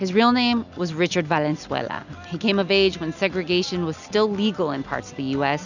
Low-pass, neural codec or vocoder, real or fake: 7.2 kHz; none; real